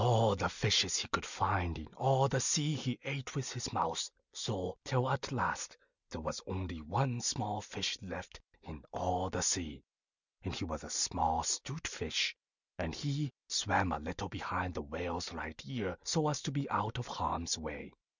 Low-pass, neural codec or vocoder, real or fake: 7.2 kHz; none; real